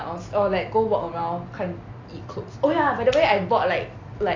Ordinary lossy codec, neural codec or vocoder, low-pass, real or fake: AAC, 48 kbps; none; 7.2 kHz; real